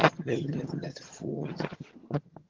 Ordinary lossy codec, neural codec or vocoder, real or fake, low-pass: Opus, 16 kbps; vocoder, 22.05 kHz, 80 mel bands, HiFi-GAN; fake; 7.2 kHz